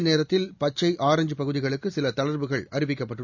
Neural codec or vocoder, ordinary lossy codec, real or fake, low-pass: none; none; real; 7.2 kHz